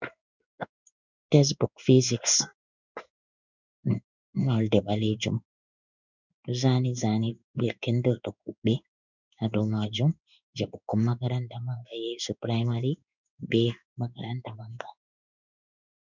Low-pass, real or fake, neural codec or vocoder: 7.2 kHz; fake; codec, 16 kHz in and 24 kHz out, 1 kbps, XY-Tokenizer